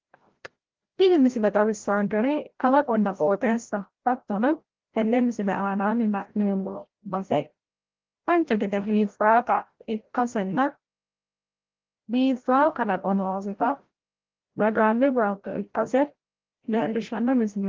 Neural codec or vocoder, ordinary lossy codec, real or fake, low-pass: codec, 16 kHz, 0.5 kbps, FreqCodec, larger model; Opus, 16 kbps; fake; 7.2 kHz